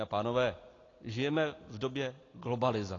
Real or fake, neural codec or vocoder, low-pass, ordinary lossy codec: real; none; 7.2 kHz; AAC, 32 kbps